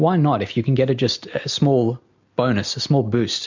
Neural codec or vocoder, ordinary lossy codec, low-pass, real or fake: none; MP3, 64 kbps; 7.2 kHz; real